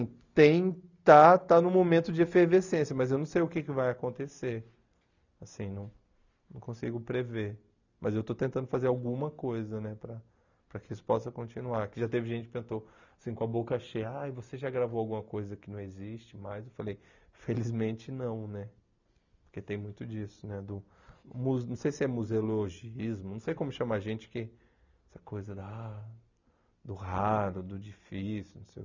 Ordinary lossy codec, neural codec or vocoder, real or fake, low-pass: none; none; real; 7.2 kHz